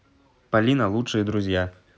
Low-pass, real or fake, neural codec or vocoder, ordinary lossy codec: none; real; none; none